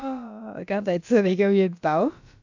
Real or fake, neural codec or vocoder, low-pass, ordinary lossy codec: fake; codec, 16 kHz, about 1 kbps, DyCAST, with the encoder's durations; 7.2 kHz; MP3, 64 kbps